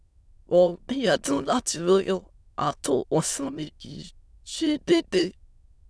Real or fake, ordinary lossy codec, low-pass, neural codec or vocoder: fake; none; none; autoencoder, 22.05 kHz, a latent of 192 numbers a frame, VITS, trained on many speakers